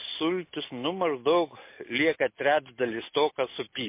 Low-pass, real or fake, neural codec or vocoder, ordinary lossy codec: 3.6 kHz; real; none; MP3, 24 kbps